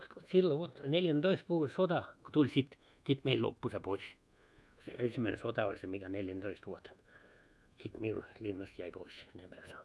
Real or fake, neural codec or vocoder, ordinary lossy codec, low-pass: fake; codec, 24 kHz, 1.2 kbps, DualCodec; none; none